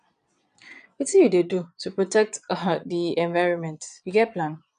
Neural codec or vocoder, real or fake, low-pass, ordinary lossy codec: vocoder, 22.05 kHz, 80 mel bands, Vocos; fake; 9.9 kHz; none